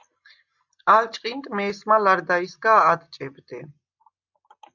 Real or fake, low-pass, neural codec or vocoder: real; 7.2 kHz; none